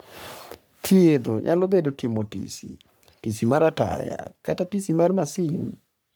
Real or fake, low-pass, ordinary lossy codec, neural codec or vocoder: fake; none; none; codec, 44.1 kHz, 3.4 kbps, Pupu-Codec